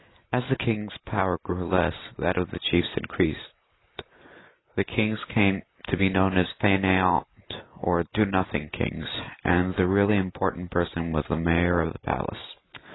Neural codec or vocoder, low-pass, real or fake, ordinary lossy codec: none; 7.2 kHz; real; AAC, 16 kbps